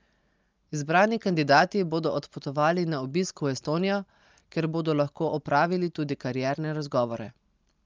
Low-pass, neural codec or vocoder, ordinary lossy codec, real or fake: 7.2 kHz; none; Opus, 24 kbps; real